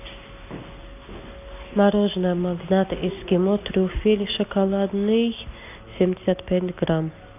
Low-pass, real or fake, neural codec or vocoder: 3.6 kHz; real; none